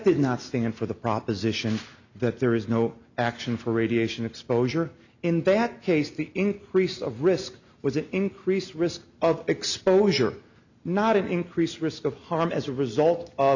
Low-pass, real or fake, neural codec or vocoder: 7.2 kHz; real; none